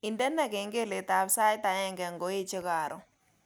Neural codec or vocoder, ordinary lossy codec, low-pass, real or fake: none; none; none; real